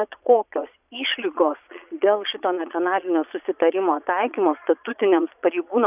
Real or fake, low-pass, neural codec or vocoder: fake; 3.6 kHz; vocoder, 22.05 kHz, 80 mel bands, Vocos